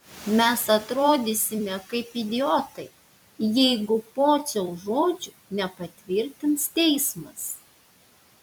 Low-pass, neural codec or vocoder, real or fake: 19.8 kHz; vocoder, 44.1 kHz, 128 mel bands every 256 samples, BigVGAN v2; fake